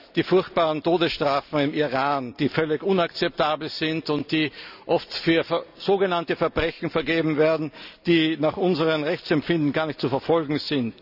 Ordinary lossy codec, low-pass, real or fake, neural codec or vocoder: none; 5.4 kHz; real; none